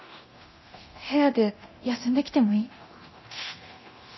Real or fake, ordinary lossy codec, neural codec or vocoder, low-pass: fake; MP3, 24 kbps; codec, 24 kHz, 0.9 kbps, DualCodec; 7.2 kHz